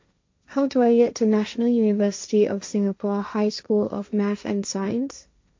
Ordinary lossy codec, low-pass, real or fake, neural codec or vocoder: MP3, 48 kbps; 7.2 kHz; fake; codec, 16 kHz, 1.1 kbps, Voila-Tokenizer